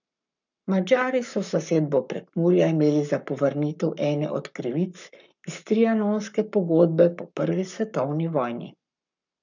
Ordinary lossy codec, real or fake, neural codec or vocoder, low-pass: none; fake; codec, 44.1 kHz, 7.8 kbps, Pupu-Codec; 7.2 kHz